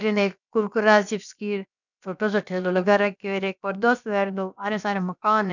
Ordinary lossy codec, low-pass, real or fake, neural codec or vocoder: none; 7.2 kHz; fake; codec, 16 kHz, about 1 kbps, DyCAST, with the encoder's durations